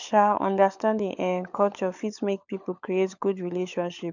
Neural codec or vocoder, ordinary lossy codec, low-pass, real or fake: codec, 16 kHz, 16 kbps, FunCodec, trained on LibriTTS, 50 frames a second; none; 7.2 kHz; fake